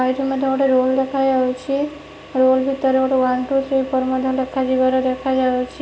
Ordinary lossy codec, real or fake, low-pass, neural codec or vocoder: none; real; none; none